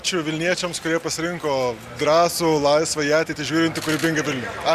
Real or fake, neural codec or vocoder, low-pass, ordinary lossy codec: real; none; 14.4 kHz; Opus, 64 kbps